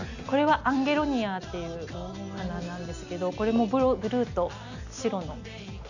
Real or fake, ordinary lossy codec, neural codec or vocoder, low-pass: real; none; none; 7.2 kHz